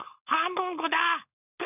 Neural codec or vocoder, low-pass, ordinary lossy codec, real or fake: codec, 16 kHz, 4.8 kbps, FACodec; 3.6 kHz; none; fake